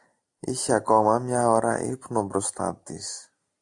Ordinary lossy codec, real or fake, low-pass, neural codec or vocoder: AAC, 64 kbps; real; 10.8 kHz; none